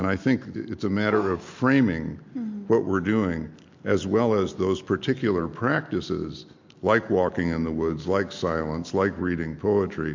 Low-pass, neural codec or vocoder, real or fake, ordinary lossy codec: 7.2 kHz; none; real; MP3, 48 kbps